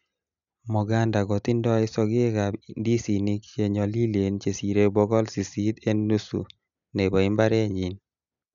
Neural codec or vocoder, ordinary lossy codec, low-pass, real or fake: none; none; 7.2 kHz; real